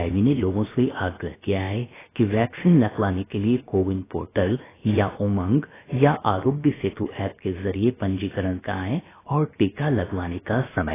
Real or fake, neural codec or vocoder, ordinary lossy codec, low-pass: fake; codec, 16 kHz, about 1 kbps, DyCAST, with the encoder's durations; AAC, 16 kbps; 3.6 kHz